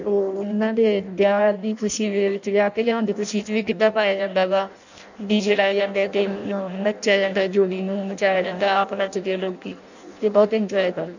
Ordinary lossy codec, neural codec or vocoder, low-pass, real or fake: none; codec, 16 kHz in and 24 kHz out, 0.6 kbps, FireRedTTS-2 codec; 7.2 kHz; fake